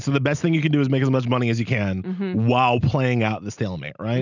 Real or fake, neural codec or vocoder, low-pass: real; none; 7.2 kHz